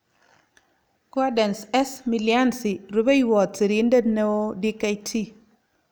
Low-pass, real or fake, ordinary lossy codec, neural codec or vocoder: none; real; none; none